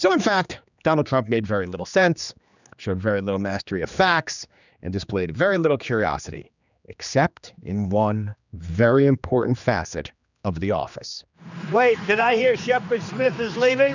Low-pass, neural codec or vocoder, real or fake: 7.2 kHz; codec, 16 kHz, 2 kbps, X-Codec, HuBERT features, trained on general audio; fake